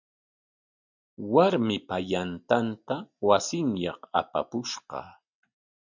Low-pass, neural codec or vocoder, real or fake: 7.2 kHz; none; real